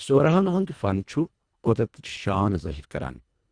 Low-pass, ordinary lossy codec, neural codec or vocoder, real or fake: 9.9 kHz; Opus, 64 kbps; codec, 24 kHz, 1.5 kbps, HILCodec; fake